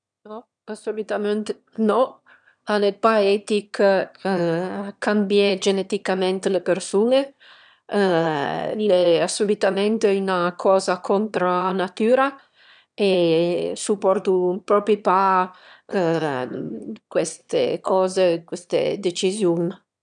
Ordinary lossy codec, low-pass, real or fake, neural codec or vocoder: none; 9.9 kHz; fake; autoencoder, 22.05 kHz, a latent of 192 numbers a frame, VITS, trained on one speaker